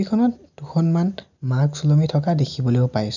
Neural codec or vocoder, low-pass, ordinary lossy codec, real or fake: none; 7.2 kHz; none; real